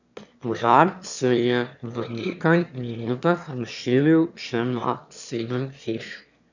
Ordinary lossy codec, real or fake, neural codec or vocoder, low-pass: none; fake; autoencoder, 22.05 kHz, a latent of 192 numbers a frame, VITS, trained on one speaker; 7.2 kHz